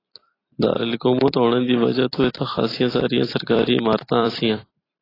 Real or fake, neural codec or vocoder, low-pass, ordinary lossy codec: fake; vocoder, 44.1 kHz, 128 mel bands every 256 samples, BigVGAN v2; 5.4 kHz; AAC, 24 kbps